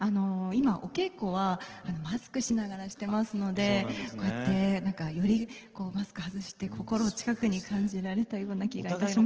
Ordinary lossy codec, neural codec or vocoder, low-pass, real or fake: Opus, 16 kbps; none; 7.2 kHz; real